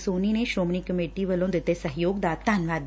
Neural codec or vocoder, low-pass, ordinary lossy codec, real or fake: none; none; none; real